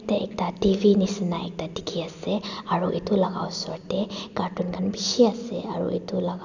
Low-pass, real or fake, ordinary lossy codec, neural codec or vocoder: 7.2 kHz; real; none; none